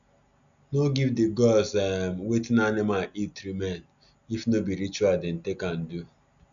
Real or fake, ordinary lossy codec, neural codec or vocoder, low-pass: real; MP3, 96 kbps; none; 7.2 kHz